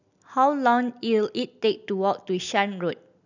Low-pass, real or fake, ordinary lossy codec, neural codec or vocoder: 7.2 kHz; real; none; none